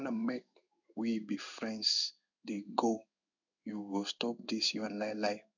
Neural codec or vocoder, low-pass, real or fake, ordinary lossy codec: codec, 16 kHz in and 24 kHz out, 1 kbps, XY-Tokenizer; 7.2 kHz; fake; none